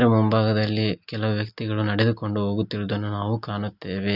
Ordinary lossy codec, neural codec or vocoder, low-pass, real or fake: none; none; 5.4 kHz; real